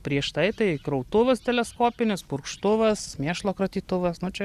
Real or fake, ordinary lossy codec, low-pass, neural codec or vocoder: real; Opus, 64 kbps; 14.4 kHz; none